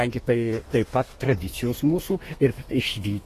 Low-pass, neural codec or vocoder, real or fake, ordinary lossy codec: 14.4 kHz; codec, 32 kHz, 1.9 kbps, SNAC; fake; AAC, 48 kbps